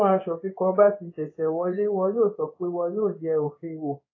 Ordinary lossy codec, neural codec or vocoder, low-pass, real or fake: AAC, 32 kbps; codec, 16 kHz in and 24 kHz out, 1 kbps, XY-Tokenizer; 7.2 kHz; fake